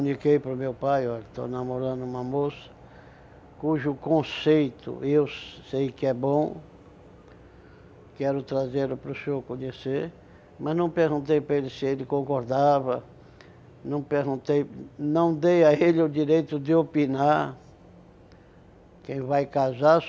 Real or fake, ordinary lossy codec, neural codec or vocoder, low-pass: real; none; none; none